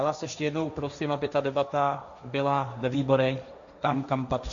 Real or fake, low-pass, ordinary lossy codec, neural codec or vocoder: fake; 7.2 kHz; MP3, 96 kbps; codec, 16 kHz, 1.1 kbps, Voila-Tokenizer